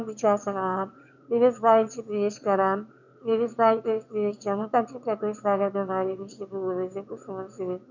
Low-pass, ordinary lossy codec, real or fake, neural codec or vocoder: 7.2 kHz; none; fake; autoencoder, 22.05 kHz, a latent of 192 numbers a frame, VITS, trained on one speaker